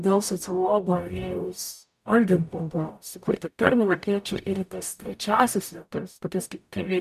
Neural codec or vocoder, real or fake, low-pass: codec, 44.1 kHz, 0.9 kbps, DAC; fake; 14.4 kHz